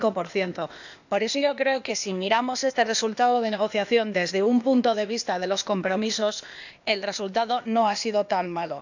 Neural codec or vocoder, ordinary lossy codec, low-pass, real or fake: codec, 16 kHz, 0.8 kbps, ZipCodec; none; 7.2 kHz; fake